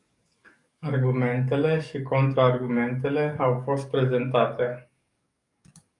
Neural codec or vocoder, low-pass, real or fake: codec, 44.1 kHz, 7.8 kbps, DAC; 10.8 kHz; fake